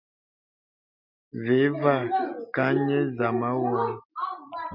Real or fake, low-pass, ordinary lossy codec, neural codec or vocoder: real; 5.4 kHz; MP3, 48 kbps; none